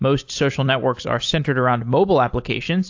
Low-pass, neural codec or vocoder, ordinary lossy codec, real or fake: 7.2 kHz; none; AAC, 48 kbps; real